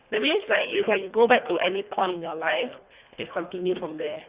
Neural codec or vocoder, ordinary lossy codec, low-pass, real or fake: codec, 24 kHz, 1.5 kbps, HILCodec; Opus, 64 kbps; 3.6 kHz; fake